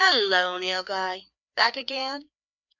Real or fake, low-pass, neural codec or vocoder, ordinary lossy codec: fake; 7.2 kHz; codec, 16 kHz, 2 kbps, FreqCodec, larger model; MP3, 64 kbps